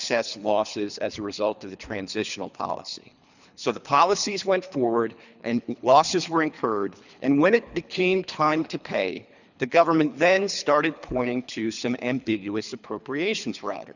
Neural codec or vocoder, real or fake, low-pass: codec, 24 kHz, 3 kbps, HILCodec; fake; 7.2 kHz